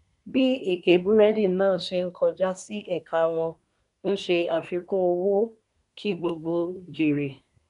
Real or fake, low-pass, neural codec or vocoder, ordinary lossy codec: fake; 10.8 kHz; codec, 24 kHz, 1 kbps, SNAC; none